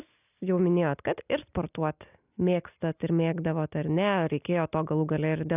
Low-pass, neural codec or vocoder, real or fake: 3.6 kHz; none; real